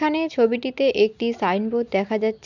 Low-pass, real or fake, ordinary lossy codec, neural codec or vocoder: 7.2 kHz; real; none; none